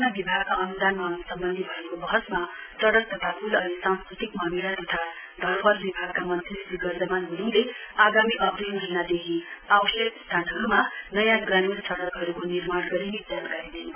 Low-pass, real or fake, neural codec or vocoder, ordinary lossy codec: 3.6 kHz; real; none; none